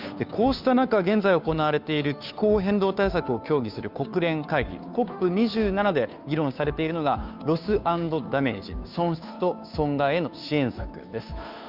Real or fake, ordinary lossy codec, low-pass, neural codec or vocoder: fake; none; 5.4 kHz; codec, 16 kHz, 2 kbps, FunCodec, trained on Chinese and English, 25 frames a second